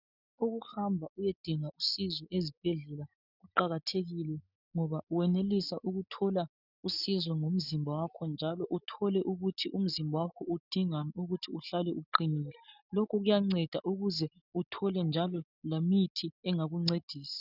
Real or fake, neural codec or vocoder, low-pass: real; none; 5.4 kHz